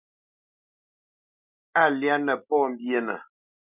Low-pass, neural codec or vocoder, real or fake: 3.6 kHz; none; real